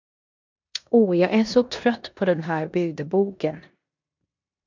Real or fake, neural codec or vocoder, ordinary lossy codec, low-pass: fake; codec, 16 kHz in and 24 kHz out, 0.9 kbps, LongCat-Audio-Codec, four codebook decoder; MP3, 64 kbps; 7.2 kHz